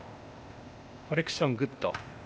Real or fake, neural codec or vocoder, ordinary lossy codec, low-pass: fake; codec, 16 kHz, 0.8 kbps, ZipCodec; none; none